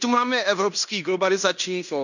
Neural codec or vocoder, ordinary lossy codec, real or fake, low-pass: codec, 16 kHz in and 24 kHz out, 0.9 kbps, LongCat-Audio-Codec, fine tuned four codebook decoder; none; fake; 7.2 kHz